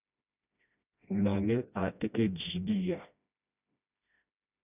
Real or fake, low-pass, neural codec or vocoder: fake; 3.6 kHz; codec, 16 kHz, 1 kbps, FreqCodec, smaller model